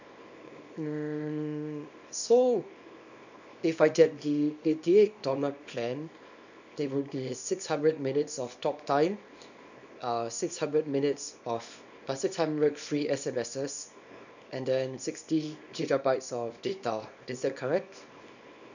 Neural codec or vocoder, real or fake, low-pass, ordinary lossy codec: codec, 24 kHz, 0.9 kbps, WavTokenizer, small release; fake; 7.2 kHz; none